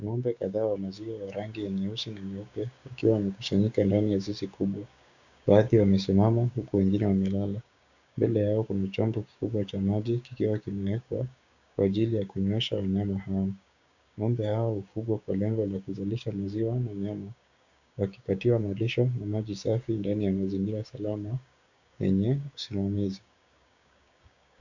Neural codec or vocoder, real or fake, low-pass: codec, 16 kHz, 6 kbps, DAC; fake; 7.2 kHz